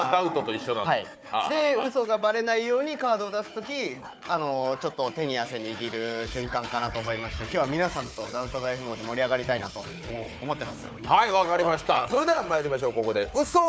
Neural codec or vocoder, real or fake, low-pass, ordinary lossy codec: codec, 16 kHz, 4 kbps, FunCodec, trained on Chinese and English, 50 frames a second; fake; none; none